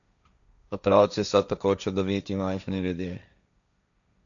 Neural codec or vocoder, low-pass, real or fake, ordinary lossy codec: codec, 16 kHz, 1.1 kbps, Voila-Tokenizer; 7.2 kHz; fake; none